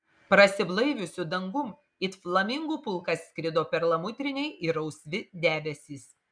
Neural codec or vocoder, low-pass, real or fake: none; 9.9 kHz; real